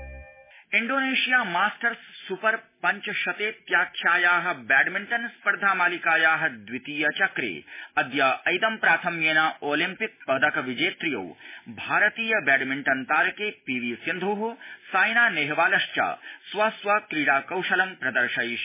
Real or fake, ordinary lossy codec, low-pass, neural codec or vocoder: real; MP3, 16 kbps; 3.6 kHz; none